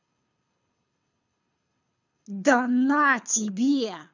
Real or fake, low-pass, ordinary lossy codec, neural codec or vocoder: fake; 7.2 kHz; none; codec, 24 kHz, 6 kbps, HILCodec